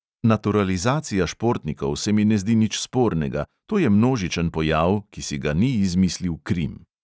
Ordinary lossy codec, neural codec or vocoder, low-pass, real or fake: none; none; none; real